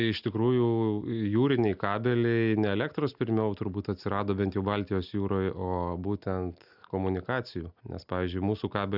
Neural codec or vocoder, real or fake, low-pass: none; real; 5.4 kHz